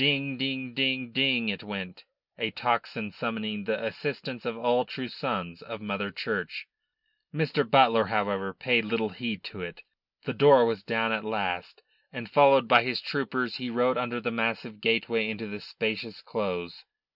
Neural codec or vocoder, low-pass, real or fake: none; 5.4 kHz; real